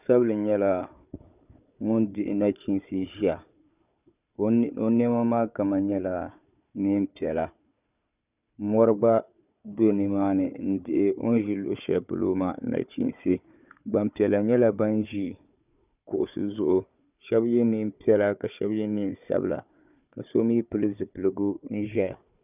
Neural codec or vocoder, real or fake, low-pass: codec, 16 kHz, 4 kbps, FunCodec, trained on Chinese and English, 50 frames a second; fake; 3.6 kHz